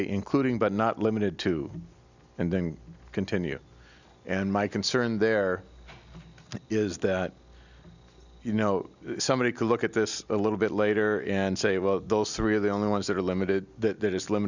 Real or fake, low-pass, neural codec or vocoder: real; 7.2 kHz; none